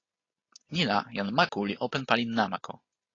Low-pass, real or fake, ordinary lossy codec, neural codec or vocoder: 7.2 kHz; real; AAC, 32 kbps; none